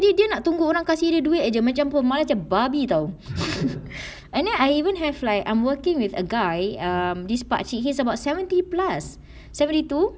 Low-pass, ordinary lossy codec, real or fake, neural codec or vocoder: none; none; real; none